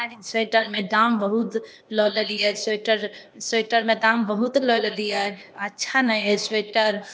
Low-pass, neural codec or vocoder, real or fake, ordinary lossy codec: none; codec, 16 kHz, 0.8 kbps, ZipCodec; fake; none